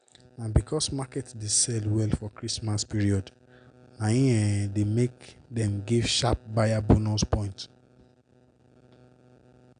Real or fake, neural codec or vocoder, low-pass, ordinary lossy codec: real; none; 9.9 kHz; none